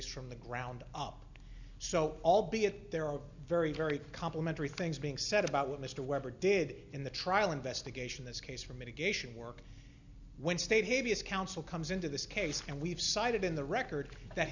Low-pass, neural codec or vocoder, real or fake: 7.2 kHz; none; real